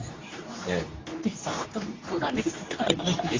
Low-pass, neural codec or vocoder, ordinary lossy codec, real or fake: 7.2 kHz; codec, 24 kHz, 0.9 kbps, WavTokenizer, medium speech release version 2; AAC, 32 kbps; fake